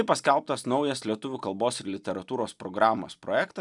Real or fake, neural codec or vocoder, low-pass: real; none; 10.8 kHz